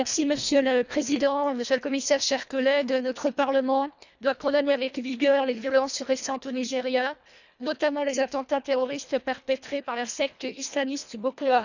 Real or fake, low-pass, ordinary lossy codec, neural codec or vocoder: fake; 7.2 kHz; none; codec, 24 kHz, 1.5 kbps, HILCodec